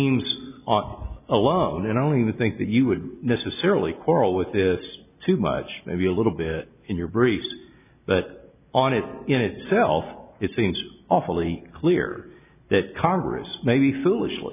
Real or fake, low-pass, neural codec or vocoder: real; 3.6 kHz; none